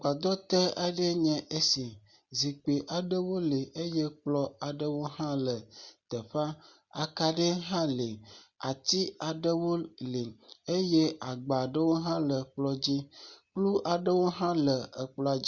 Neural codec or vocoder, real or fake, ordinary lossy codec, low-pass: none; real; Opus, 64 kbps; 7.2 kHz